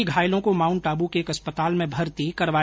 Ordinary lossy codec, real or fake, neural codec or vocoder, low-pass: none; real; none; none